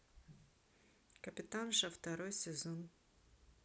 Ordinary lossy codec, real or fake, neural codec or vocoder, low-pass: none; real; none; none